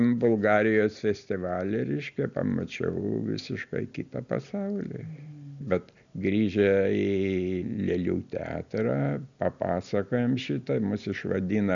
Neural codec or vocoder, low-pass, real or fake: none; 7.2 kHz; real